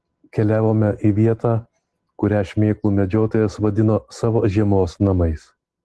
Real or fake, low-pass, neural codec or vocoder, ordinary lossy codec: real; 10.8 kHz; none; Opus, 16 kbps